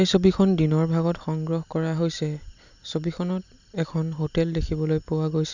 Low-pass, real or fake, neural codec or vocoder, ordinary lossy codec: 7.2 kHz; real; none; none